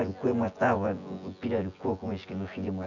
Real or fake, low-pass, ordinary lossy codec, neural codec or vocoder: fake; 7.2 kHz; none; vocoder, 24 kHz, 100 mel bands, Vocos